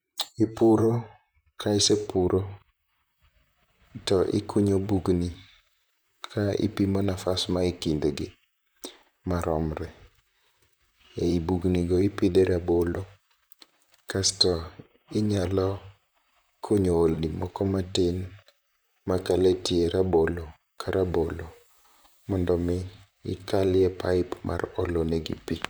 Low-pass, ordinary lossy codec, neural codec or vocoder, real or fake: none; none; vocoder, 44.1 kHz, 128 mel bands, Pupu-Vocoder; fake